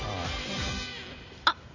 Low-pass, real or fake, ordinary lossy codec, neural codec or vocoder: 7.2 kHz; real; none; none